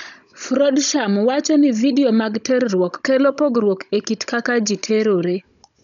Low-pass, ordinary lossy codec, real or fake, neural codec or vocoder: 7.2 kHz; none; fake; codec, 16 kHz, 16 kbps, FunCodec, trained on Chinese and English, 50 frames a second